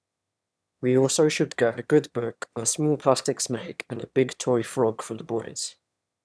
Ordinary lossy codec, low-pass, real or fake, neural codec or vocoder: none; none; fake; autoencoder, 22.05 kHz, a latent of 192 numbers a frame, VITS, trained on one speaker